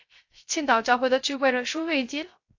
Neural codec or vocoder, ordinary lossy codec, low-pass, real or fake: codec, 16 kHz, 0.3 kbps, FocalCodec; AAC, 48 kbps; 7.2 kHz; fake